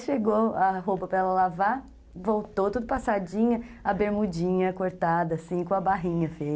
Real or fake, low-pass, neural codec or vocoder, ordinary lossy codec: real; none; none; none